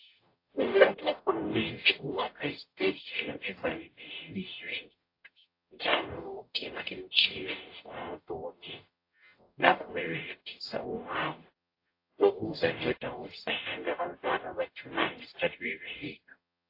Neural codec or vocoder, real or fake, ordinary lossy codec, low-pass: codec, 44.1 kHz, 0.9 kbps, DAC; fake; AAC, 32 kbps; 5.4 kHz